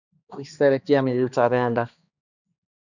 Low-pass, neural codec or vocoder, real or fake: 7.2 kHz; codec, 16 kHz, 2 kbps, X-Codec, HuBERT features, trained on balanced general audio; fake